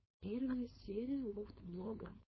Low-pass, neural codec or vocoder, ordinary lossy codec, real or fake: 7.2 kHz; codec, 16 kHz, 4.8 kbps, FACodec; MP3, 24 kbps; fake